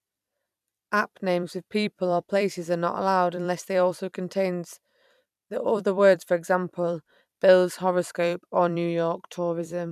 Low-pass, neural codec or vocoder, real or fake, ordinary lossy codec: 14.4 kHz; vocoder, 44.1 kHz, 128 mel bands every 256 samples, BigVGAN v2; fake; none